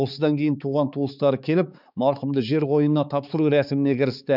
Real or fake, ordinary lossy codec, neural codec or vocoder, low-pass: fake; none; codec, 16 kHz, 4 kbps, X-Codec, HuBERT features, trained on balanced general audio; 5.4 kHz